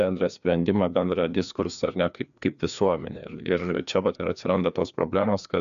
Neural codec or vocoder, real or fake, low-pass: codec, 16 kHz, 2 kbps, FreqCodec, larger model; fake; 7.2 kHz